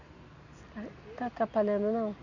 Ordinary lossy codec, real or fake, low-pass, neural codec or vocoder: none; real; 7.2 kHz; none